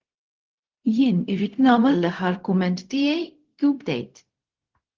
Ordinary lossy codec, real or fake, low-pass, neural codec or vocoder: Opus, 16 kbps; fake; 7.2 kHz; codec, 16 kHz, 0.4 kbps, LongCat-Audio-Codec